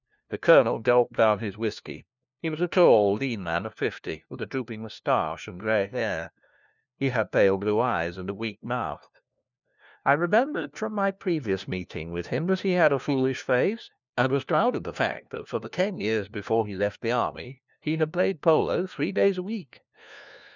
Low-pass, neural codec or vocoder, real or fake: 7.2 kHz; codec, 16 kHz, 1 kbps, FunCodec, trained on LibriTTS, 50 frames a second; fake